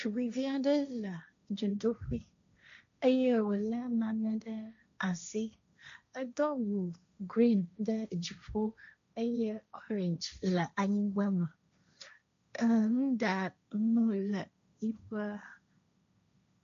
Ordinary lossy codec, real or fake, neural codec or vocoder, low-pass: MP3, 64 kbps; fake; codec, 16 kHz, 1.1 kbps, Voila-Tokenizer; 7.2 kHz